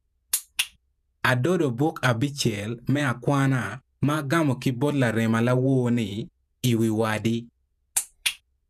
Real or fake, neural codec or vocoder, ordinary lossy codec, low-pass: fake; vocoder, 48 kHz, 128 mel bands, Vocos; none; 14.4 kHz